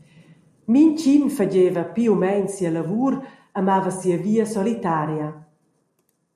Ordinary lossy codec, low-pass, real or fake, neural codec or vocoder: MP3, 64 kbps; 14.4 kHz; real; none